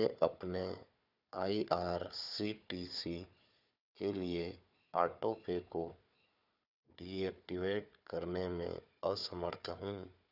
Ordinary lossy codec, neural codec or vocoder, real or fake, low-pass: none; codec, 16 kHz, 4 kbps, FunCodec, trained on Chinese and English, 50 frames a second; fake; 5.4 kHz